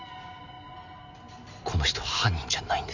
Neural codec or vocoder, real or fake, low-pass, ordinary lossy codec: none; real; 7.2 kHz; none